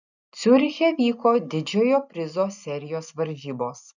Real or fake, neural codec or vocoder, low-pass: real; none; 7.2 kHz